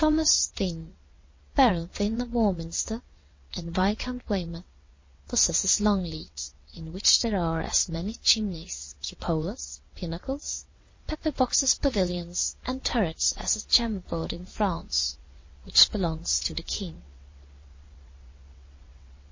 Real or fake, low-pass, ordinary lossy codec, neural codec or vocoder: fake; 7.2 kHz; MP3, 32 kbps; vocoder, 22.05 kHz, 80 mel bands, WaveNeXt